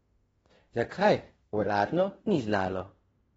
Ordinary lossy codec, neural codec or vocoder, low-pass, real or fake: AAC, 24 kbps; codec, 16 kHz in and 24 kHz out, 0.9 kbps, LongCat-Audio-Codec, fine tuned four codebook decoder; 10.8 kHz; fake